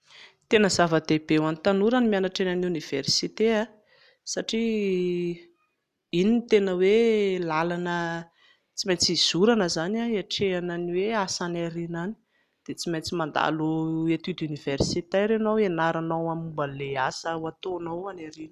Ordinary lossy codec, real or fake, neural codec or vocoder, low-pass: none; real; none; 14.4 kHz